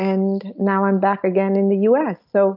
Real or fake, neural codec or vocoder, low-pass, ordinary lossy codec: real; none; 5.4 kHz; AAC, 48 kbps